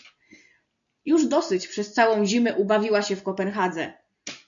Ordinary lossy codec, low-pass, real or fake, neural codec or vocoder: MP3, 96 kbps; 7.2 kHz; real; none